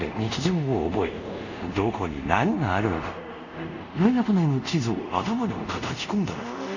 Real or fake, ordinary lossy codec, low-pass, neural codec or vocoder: fake; none; 7.2 kHz; codec, 24 kHz, 0.5 kbps, DualCodec